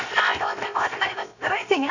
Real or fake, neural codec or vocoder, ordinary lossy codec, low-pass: fake; codec, 16 kHz, 0.7 kbps, FocalCodec; none; 7.2 kHz